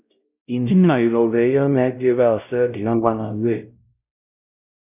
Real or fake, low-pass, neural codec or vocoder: fake; 3.6 kHz; codec, 16 kHz, 0.5 kbps, X-Codec, WavLM features, trained on Multilingual LibriSpeech